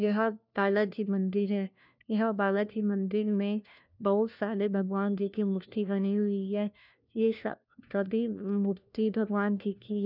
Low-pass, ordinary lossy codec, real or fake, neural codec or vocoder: 5.4 kHz; none; fake; codec, 16 kHz, 1 kbps, FunCodec, trained on LibriTTS, 50 frames a second